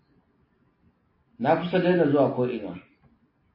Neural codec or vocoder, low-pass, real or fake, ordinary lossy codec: none; 5.4 kHz; real; MP3, 24 kbps